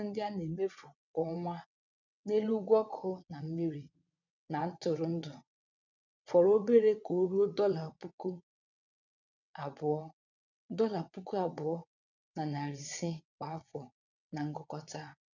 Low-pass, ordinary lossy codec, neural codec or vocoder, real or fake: 7.2 kHz; none; vocoder, 22.05 kHz, 80 mel bands, WaveNeXt; fake